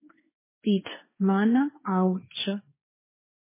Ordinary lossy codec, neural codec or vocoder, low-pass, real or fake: MP3, 16 kbps; codec, 16 kHz, 1.1 kbps, Voila-Tokenizer; 3.6 kHz; fake